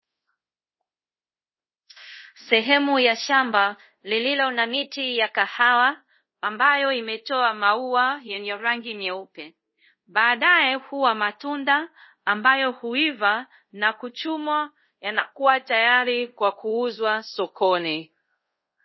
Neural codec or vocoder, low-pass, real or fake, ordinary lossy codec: codec, 24 kHz, 0.5 kbps, DualCodec; 7.2 kHz; fake; MP3, 24 kbps